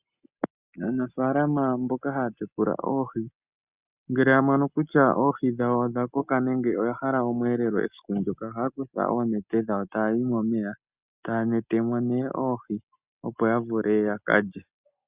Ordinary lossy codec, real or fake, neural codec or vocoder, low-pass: Opus, 64 kbps; real; none; 3.6 kHz